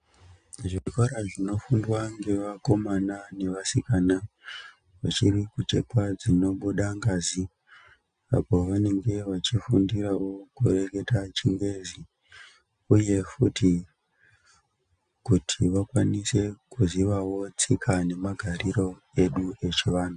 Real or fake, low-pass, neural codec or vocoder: real; 9.9 kHz; none